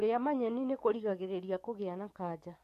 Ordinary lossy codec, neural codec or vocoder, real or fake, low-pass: MP3, 64 kbps; codec, 44.1 kHz, 7.8 kbps, DAC; fake; 14.4 kHz